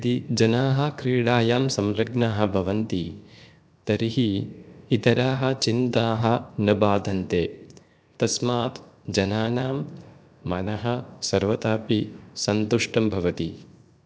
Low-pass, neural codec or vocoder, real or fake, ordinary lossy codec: none; codec, 16 kHz, 0.7 kbps, FocalCodec; fake; none